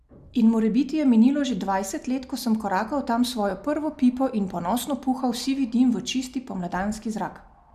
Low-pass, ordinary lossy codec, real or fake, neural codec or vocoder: 14.4 kHz; none; real; none